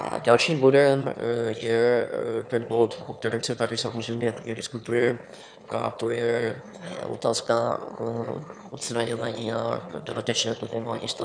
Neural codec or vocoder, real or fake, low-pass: autoencoder, 22.05 kHz, a latent of 192 numbers a frame, VITS, trained on one speaker; fake; 9.9 kHz